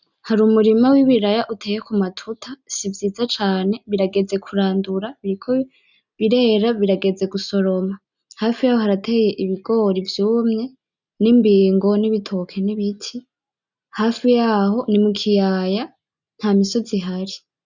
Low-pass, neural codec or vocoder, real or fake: 7.2 kHz; none; real